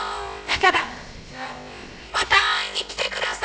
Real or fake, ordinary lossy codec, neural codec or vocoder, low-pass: fake; none; codec, 16 kHz, about 1 kbps, DyCAST, with the encoder's durations; none